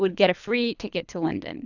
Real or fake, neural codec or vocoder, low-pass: fake; codec, 24 kHz, 3 kbps, HILCodec; 7.2 kHz